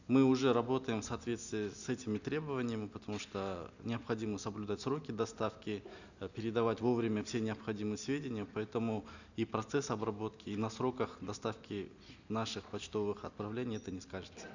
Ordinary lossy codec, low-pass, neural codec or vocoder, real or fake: none; 7.2 kHz; none; real